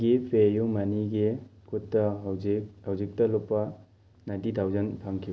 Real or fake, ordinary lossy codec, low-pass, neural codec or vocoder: real; none; none; none